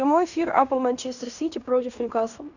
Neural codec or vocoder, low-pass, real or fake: codec, 16 kHz in and 24 kHz out, 0.9 kbps, LongCat-Audio-Codec, fine tuned four codebook decoder; 7.2 kHz; fake